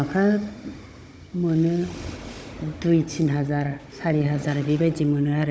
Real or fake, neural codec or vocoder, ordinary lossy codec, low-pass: fake; codec, 16 kHz, 16 kbps, FunCodec, trained on Chinese and English, 50 frames a second; none; none